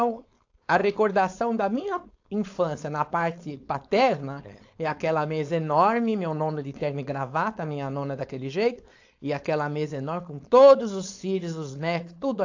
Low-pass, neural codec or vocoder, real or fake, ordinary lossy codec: 7.2 kHz; codec, 16 kHz, 4.8 kbps, FACodec; fake; AAC, 48 kbps